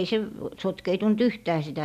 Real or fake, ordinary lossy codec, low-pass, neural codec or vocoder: real; none; 14.4 kHz; none